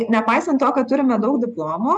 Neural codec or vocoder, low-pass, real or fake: none; 10.8 kHz; real